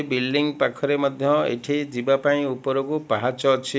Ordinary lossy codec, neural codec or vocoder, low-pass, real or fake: none; none; none; real